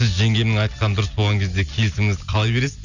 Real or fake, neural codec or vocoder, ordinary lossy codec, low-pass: real; none; none; 7.2 kHz